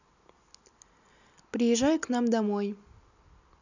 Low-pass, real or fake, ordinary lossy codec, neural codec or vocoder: 7.2 kHz; real; none; none